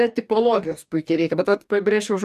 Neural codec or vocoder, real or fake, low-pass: codec, 44.1 kHz, 2.6 kbps, DAC; fake; 14.4 kHz